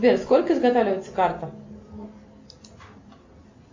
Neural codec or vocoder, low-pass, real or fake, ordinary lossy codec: none; 7.2 kHz; real; MP3, 48 kbps